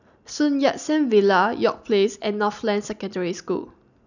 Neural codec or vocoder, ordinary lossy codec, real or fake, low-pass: none; none; real; 7.2 kHz